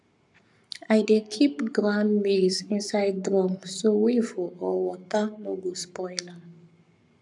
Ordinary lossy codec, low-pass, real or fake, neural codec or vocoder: none; 10.8 kHz; fake; codec, 44.1 kHz, 7.8 kbps, Pupu-Codec